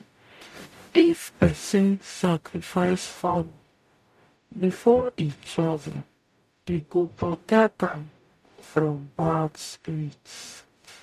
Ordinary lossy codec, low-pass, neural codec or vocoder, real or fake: MP3, 64 kbps; 14.4 kHz; codec, 44.1 kHz, 0.9 kbps, DAC; fake